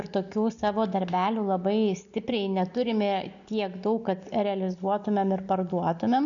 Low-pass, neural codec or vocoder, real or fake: 7.2 kHz; none; real